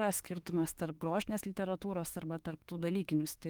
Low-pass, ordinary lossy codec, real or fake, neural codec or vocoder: 19.8 kHz; Opus, 24 kbps; fake; autoencoder, 48 kHz, 32 numbers a frame, DAC-VAE, trained on Japanese speech